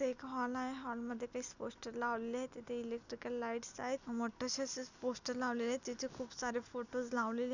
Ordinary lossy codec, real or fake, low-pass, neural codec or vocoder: none; real; 7.2 kHz; none